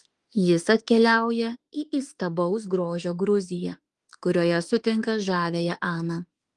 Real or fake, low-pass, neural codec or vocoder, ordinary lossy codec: fake; 10.8 kHz; autoencoder, 48 kHz, 32 numbers a frame, DAC-VAE, trained on Japanese speech; Opus, 24 kbps